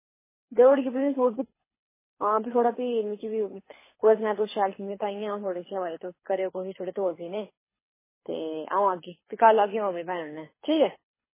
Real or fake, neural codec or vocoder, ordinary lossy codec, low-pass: fake; codec, 24 kHz, 6 kbps, HILCodec; MP3, 16 kbps; 3.6 kHz